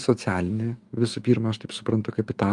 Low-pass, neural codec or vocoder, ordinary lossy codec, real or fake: 10.8 kHz; autoencoder, 48 kHz, 128 numbers a frame, DAC-VAE, trained on Japanese speech; Opus, 32 kbps; fake